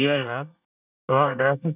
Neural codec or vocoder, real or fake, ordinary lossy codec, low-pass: codec, 24 kHz, 1 kbps, SNAC; fake; none; 3.6 kHz